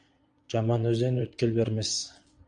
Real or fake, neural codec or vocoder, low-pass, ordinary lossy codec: fake; vocoder, 22.05 kHz, 80 mel bands, Vocos; 9.9 kHz; AAC, 64 kbps